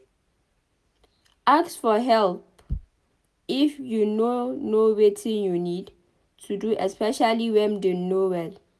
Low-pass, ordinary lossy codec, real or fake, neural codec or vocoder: none; none; real; none